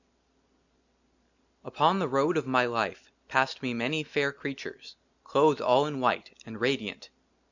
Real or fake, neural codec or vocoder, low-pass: real; none; 7.2 kHz